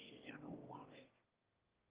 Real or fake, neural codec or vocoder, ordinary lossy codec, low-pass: fake; autoencoder, 22.05 kHz, a latent of 192 numbers a frame, VITS, trained on one speaker; Opus, 32 kbps; 3.6 kHz